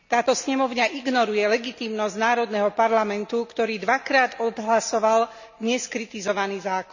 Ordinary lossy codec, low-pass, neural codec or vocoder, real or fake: none; 7.2 kHz; none; real